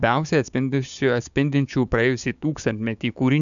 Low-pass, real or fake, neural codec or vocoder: 7.2 kHz; fake; codec, 16 kHz, 6 kbps, DAC